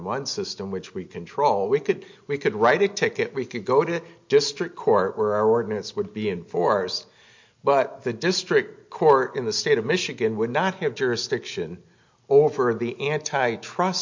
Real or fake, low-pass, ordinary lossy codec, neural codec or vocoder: real; 7.2 kHz; MP3, 48 kbps; none